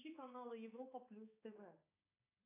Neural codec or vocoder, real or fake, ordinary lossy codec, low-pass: codec, 16 kHz, 4 kbps, X-Codec, HuBERT features, trained on balanced general audio; fake; AAC, 16 kbps; 3.6 kHz